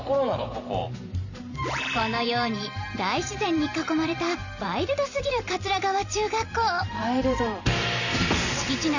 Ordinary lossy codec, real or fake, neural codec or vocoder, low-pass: none; fake; vocoder, 44.1 kHz, 128 mel bands every 512 samples, BigVGAN v2; 7.2 kHz